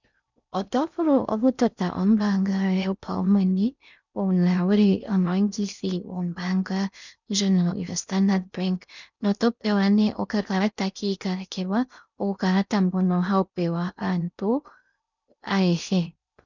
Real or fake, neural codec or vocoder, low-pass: fake; codec, 16 kHz in and 24 kHz out, 0.6 kbps, FocalCodec, streaming, 4096 codes; 7.2 kHz